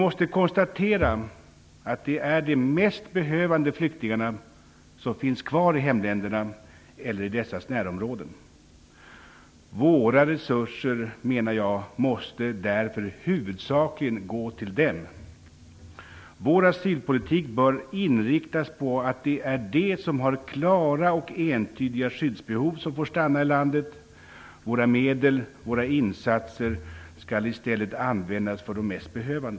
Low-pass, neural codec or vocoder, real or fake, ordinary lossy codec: none; none; real; none